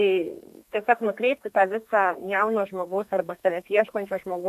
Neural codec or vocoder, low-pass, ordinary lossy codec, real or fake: codec, 32 kHz, 1.9 kbps, SNAC; 14.4 kHz; AAC, 96 kbps; fake